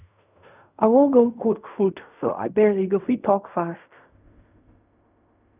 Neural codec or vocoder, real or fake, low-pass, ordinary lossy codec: codec, 16 kHz in and 24 kHz out, 0.4 kbps, LongCat-Audio-Codec, fine tuned four codebook decoder; fake; 3.6 kHz; none